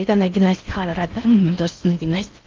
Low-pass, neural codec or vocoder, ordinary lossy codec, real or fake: 7.2 kHz; codec, 16 kHz in and 24 kHz out, 0.6 kbps, FocalCodec, streaming, 4096 codes; Opus, 32 kbps; fake